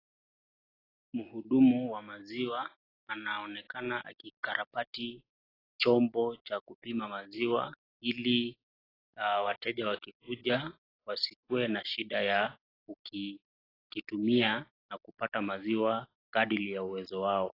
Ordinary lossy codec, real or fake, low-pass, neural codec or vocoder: AAC, 24 kbps; real; 5.4 kHz; none